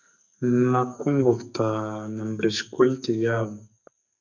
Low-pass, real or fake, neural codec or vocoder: 7.2 kHz; fake; codec, 44.1 kHz, 2.6 kbps, SNAC